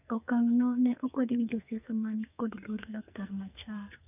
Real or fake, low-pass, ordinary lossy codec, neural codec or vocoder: fake; 3.6 kHz; none; codec, 44.1 kHz, 2.6 kbps, SNAC